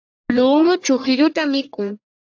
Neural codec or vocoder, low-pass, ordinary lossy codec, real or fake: codec, 44.1 kHz, 1.7 kbps, Pupu-Codec; 7.2 kHz; AAC, 48 kbps; fake